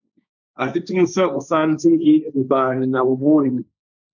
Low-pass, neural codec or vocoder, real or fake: 7.2 kHz; codec, 16 kHz, 1.1 kbps, Voila-Tokenizer; fake